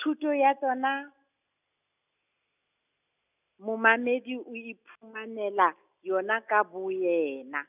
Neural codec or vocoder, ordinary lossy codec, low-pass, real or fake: none; none; 3.6 kHz; real